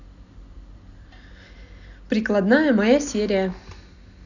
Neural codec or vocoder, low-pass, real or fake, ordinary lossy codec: none; 7.2 kHz; real; none